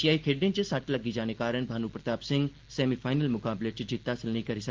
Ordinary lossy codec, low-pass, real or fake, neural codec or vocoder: Opus, 16 kbps; 7.2 kHz; real; none